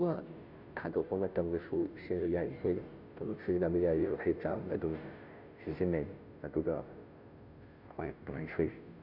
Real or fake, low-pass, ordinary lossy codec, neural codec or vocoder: fake; 5.4 kHz; none; codec, 16 kHz, 0.5 kbps, FunCodec, trained on Chinese and English, 25 frames a second